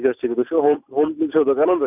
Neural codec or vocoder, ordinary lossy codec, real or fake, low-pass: none; none; real; 3.6 kHz